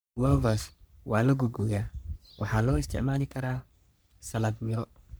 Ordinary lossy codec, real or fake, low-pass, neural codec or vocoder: none; fake; none; codec, 44.1 kHz, 3.4 kbps, Pupu-Codec